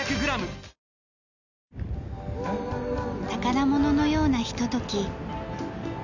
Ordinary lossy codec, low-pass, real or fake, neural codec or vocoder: none; 7.2 kHz; real; none